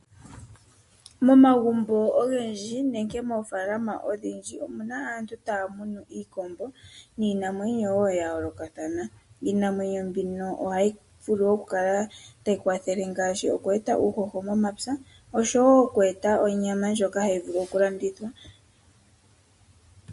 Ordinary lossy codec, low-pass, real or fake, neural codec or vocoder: MP3, 48 kbps; 14.4 kHz; real; none